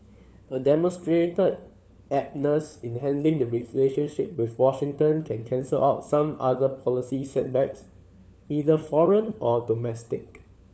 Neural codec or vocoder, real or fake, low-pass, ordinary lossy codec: codec, 16 kHz, 4 kbps, FunCodec, trained on LibriTTS, 50 frames a second; fake; none; none